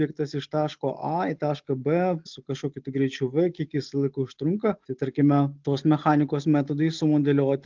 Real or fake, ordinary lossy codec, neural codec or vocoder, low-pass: real; Opus, 32 kbps; none; 7.2 kHz